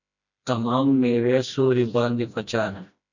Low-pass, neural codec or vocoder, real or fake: 7.2 kHz; codec, 16 kHz, 1 kbps, FreqCodec, smaller model; fake